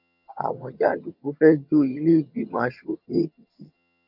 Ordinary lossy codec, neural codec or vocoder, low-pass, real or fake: none; vocoder, 22.05 kHz, 80 mel bands, HiFi-GAN; 5.4 kHz; fake